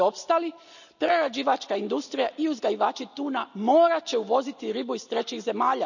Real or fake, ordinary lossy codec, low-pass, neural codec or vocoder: real; none; 7.2 kHz; none